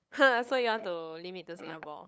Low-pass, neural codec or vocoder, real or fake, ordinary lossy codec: none; codec, 16 kHz, 8 kbps, FunCodec, trained on LibriTTS, 25 frames a second; fake; none